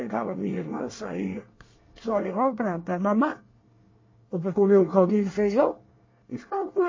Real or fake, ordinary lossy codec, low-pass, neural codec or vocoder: fake; MP3, 32 kbps; 7.2 kHz; codec, 24 kHz, 1 kbps, SNAC